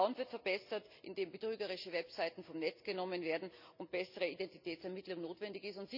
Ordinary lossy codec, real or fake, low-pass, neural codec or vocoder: none; real; 5.4 kHz; none